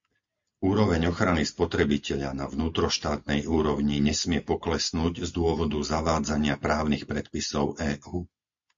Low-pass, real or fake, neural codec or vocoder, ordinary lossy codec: 7.2 kHz; real; none; MP3, 48 kbps